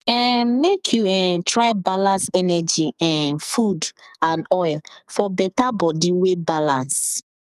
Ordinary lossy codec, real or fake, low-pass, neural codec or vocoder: none; fake; 14.4 kHz; codec, 44.1 kHz, 2.6 kbps, SNAC